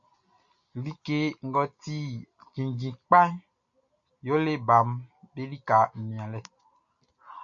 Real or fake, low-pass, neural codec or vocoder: real; 7.2 kHz; none